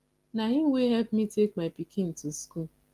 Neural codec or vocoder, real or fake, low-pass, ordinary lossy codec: none; real; 14.4 kHz; Opus, 24 kbps